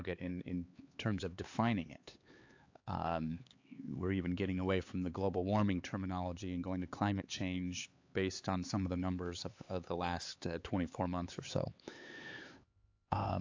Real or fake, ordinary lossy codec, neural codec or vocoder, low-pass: fake; AAC, 48 kbps; codec, 16 kHz, 4 kbps, X-Codec, HuBERT features, trained on LibriSpeech; 7.2 kHz